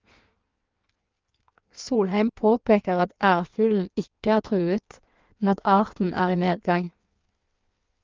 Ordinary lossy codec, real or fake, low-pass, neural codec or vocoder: Opus, 24 kbps; fake; 7.2 kHz; codec, 16 kHz in and 24 kHz out, 1.1 kbps, FireRedTTS-2 codec